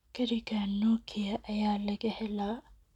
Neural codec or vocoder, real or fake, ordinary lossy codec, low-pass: none; real; none; 19.8 kHz